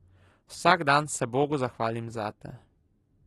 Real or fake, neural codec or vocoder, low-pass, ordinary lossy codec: real; none; 14.4 kHz; AAC, 32 kbps